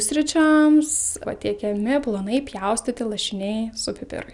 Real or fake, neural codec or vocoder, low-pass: real; none; 10.8 kHz